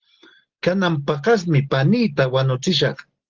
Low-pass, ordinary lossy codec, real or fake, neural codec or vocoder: 7.2 kHz; Opus, 16 kbps; real; none